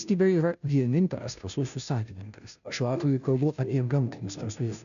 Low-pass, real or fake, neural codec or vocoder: 7.2 kHz; fake; codec, 16 kHz, 0.5 kbps, FunCodec, trained on Chinese and English, 25 frames a second